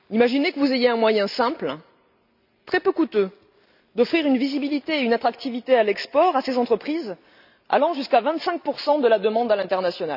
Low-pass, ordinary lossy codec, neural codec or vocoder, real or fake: 5.4 kHz; none; none; real